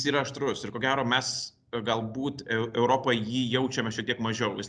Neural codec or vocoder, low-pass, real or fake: none; 9.9 kHz; real